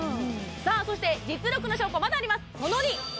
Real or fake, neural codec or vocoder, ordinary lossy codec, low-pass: real; none; none; none